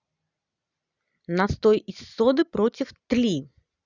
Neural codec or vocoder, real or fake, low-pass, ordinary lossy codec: none; real; 7.2 kHz; Opus, 64 kbps